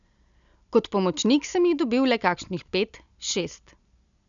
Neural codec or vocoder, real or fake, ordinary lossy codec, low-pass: none; real; none; 7.2 kHz